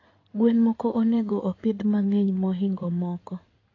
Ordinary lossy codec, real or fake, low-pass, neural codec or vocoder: AAC, 32 kbps; fake; 7.2 kHz; codec, 16 kHz in and 24 kHz out, 2.2 kbps, FireRedTTS-2 codec